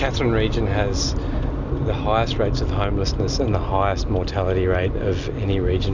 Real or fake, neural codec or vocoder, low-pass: real; none; 7.2 kHz